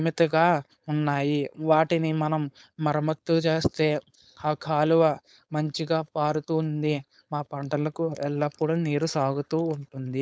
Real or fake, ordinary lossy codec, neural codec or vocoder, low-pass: fake; none; codec, 16 kHz, 4.8 kbps, FACodec; none